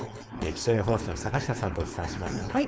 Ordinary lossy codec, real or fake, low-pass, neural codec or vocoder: none; fake; none; codec, 16 kHz, 4.8 kbps, FACodec